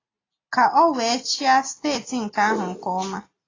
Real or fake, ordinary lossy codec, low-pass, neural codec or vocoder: real; AAC, 32 kbps; 7.2 kHz; none